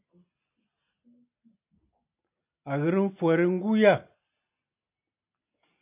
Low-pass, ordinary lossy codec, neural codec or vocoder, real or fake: 3.6 kHz; AAC, 32 kbps; none; real